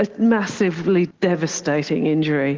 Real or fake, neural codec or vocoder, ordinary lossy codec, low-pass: real; none; Opus, 16 kbps; 7.2 kHz